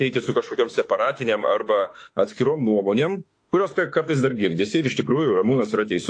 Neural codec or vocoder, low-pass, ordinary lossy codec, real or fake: autoencoder, 48 kHz, 32 numbers a frame, DAC-VAE, trained on Japanese speech; 9.9 kHz; AAC, 48 kbps; fake